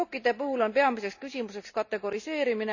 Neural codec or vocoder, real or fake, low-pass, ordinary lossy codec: none; real; 7.2 kHz; none